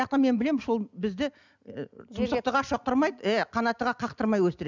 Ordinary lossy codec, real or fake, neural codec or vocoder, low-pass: none; real; none; 7.2 kHz